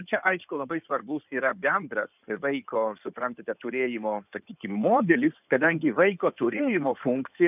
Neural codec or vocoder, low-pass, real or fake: codec, 16 kHz in and 24 kHz out, 2.2 kbps, FireRedTTS-2 codec; 3.6 kHz; fake